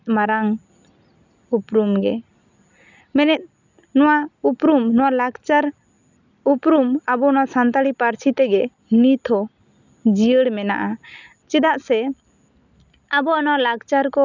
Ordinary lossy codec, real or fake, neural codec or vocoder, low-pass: none; real; none; 7.2 kHz